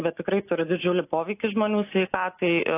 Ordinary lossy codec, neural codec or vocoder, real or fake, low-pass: AAC, 24 kbps; none; real; 3.6 kHz